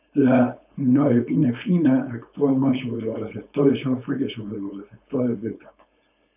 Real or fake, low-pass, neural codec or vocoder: fake; 3.6 kHz; codec, 16 kHz, 4.8 kbps, FACodec